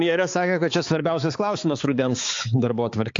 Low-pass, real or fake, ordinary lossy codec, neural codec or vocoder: 7.2 kHz; fake; AAC, 48 kbps; codec, 16 kHz, 4 kbps, X-Codec, HuBERT features, trained on balanced general audio